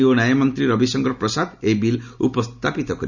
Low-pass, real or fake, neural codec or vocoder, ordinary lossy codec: 7.2 kHz; real; none; none